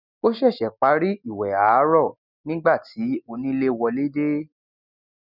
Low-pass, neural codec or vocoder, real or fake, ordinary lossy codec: 5.4 kHz; none; real; none